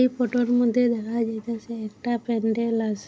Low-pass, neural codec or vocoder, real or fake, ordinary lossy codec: none; none; real; none